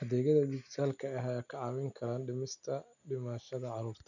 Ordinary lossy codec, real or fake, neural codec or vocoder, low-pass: none; real; none; 7.2 kHz